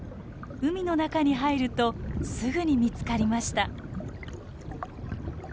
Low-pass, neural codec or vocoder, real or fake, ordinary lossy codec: none; none; real; none